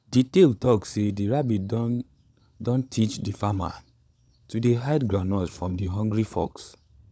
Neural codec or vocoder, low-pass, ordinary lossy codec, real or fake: codec, 16 kHz, 16 kbps, FunCodec, trained on LibriTTS, 50 frames a second; none; none; fake